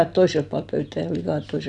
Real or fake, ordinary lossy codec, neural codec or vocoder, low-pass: real; none; none; 10.8 kHz